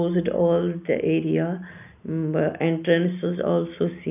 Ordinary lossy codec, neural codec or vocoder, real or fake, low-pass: none; none; real; 3.6 kHz